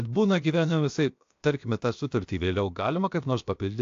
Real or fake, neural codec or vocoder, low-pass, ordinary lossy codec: fake; codec, 16 kHz, about 1 kbps, DyCAST, with the encoder's durations; 7.2 kHz; AAC, 48 kbps